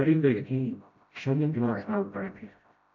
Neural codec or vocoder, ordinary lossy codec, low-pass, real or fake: codec, 16 kHz, 0.5 kbps, FreqCodec, smaller model; MP3, 48 kbps; 7.2 kHz; fake